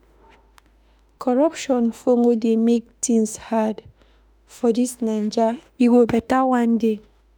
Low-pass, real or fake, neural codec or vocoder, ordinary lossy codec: none; fake; autoencoder, 48 kHz, 32 numbers a frame, DAC-VAE, trained on Japanese speech; none